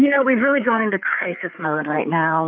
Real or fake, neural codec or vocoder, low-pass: fake; codec, 16 kHz, 4 kbps, FreqCodec, larger model; 7.2 kHz